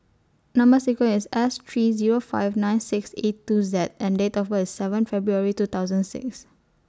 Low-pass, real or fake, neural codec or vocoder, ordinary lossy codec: none; real; none; none